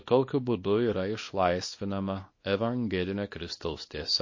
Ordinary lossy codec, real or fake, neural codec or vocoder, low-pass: MP3, 32 kbps; fake; codec, 24 kHz, 0.9 kbps, WavTokenizer, small release; 7.2 kHz